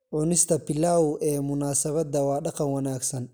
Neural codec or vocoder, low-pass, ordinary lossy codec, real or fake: none; none; none; real